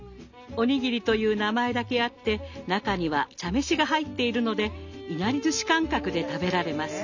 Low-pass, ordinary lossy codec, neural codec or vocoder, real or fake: 7.2 kHz; none; none; real